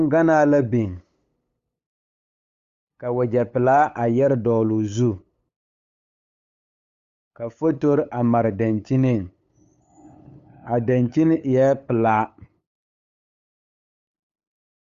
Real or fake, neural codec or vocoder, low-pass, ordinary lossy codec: fake; codec, 16 kHz, 8 kbps, FunCodec, trained on LibriTTS, 25 frames a second; 7.2 kHz; Opus, 64 kbps